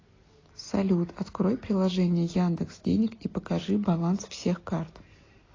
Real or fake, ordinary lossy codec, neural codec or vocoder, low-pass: real; AAC, 32 kbps; none; 7.2 kHz